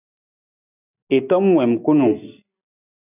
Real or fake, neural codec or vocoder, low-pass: real; none; 3.6 kHz